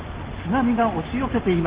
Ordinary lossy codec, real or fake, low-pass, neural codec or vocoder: Opus, 16 kbps; real; 3.6 kHz; none